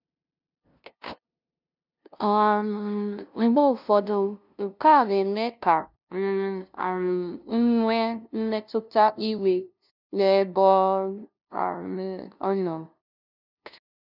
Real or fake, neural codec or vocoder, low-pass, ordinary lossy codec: fake; codec, 16 kHz, 0.5 kbps, FunCodec, trained on LibriTTS, 25 frames a second; 5.4 kHz; none